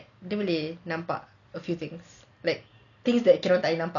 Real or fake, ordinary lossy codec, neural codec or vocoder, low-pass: real; none; none; 7.2 kHz